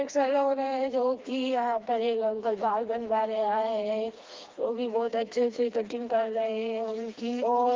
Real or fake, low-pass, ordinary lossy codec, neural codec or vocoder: fake; 7.2 kHz; Opus, 24 kbps; codec, 16 kHz, 2 kbps, FreqCodec, smaller model